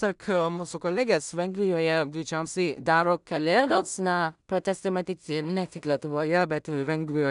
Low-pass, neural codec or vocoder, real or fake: 10.8 kHz; codec, 16 kHz in and 24 kHz out, 0.4 kbps, LongCat-Audio-Codec, two codebook decoder; fake